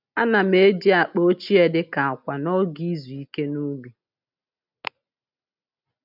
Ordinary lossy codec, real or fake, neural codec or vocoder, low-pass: none; real; none; 5.4 kHz